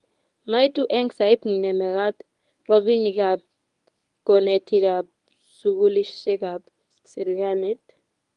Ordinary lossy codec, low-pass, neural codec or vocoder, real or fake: Opus, 32 kbps; 10.8 kHz; codec, 24 kHz, 0.9 kbps, WavTokenizer, medium speech release version 1; fake